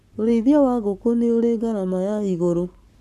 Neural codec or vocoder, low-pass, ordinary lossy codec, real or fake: codec, 44.1 kHz, 7.8 kbps, Pupu-Codec; 14.4 kHz; none; fake